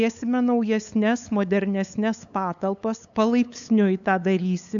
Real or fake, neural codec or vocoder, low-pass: fake; codec, 16 kHz, 8 kbps, FunCodec, trained on LibriTTS, 25 frames a second; 7.2 kHz